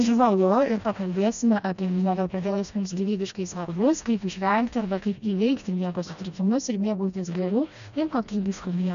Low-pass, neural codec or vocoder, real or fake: 7.2 kHz; codec, 16 kHz, 1 kbps, FreqCodec, smaller model; fake